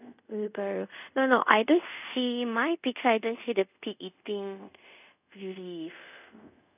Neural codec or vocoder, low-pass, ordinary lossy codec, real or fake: codec, 24 kHz, 0.5 kbps, DualCodec; 3.6 kHz; none; fake